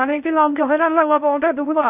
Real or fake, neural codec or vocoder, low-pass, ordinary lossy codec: fake; codec, 16 kHz in and 24 kHz out, 0.6 kbps, FocalCodec, streaming, 2048 codes; 3.6 kHz; none